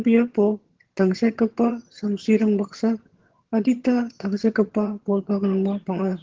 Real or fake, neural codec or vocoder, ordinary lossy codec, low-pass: fake; vocoder, 22.05 kHz, 80 mel bands, HiFi-GAN; Opus, 16 kbps; 7.2 kHz